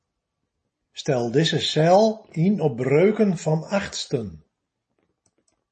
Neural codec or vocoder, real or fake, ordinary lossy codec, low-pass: none; real; MP3, 32 kbps; 9.9 kHz